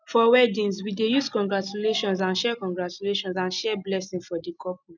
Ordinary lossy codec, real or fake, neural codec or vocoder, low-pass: none; real; none; 7.2 kHz